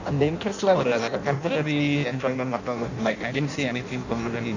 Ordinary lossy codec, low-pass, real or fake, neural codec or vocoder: none; 7.2 kHz; fake; codec, 16 kHz in and 24 kHz out, 0.6 kbps, FireRedTTS-2 codec